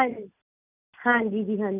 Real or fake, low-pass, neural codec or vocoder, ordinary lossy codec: real; 3.6 kHz; none; none